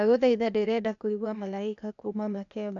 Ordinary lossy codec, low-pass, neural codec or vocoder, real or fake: none; 7.2 kHz; codec, 16 kHz, 0.8 kbps, ZipCodec; fake